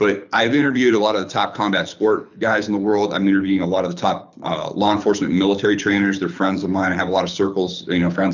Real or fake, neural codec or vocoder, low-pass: fake; codec, 24 kHz, 6 kbps, HILCodec; 7.2 kHz